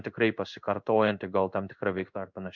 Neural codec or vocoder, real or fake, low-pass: codec, 16 kHz in and 24 kHz out, 1 kbps, XY-Tokenizer; fake; 7.2 kHz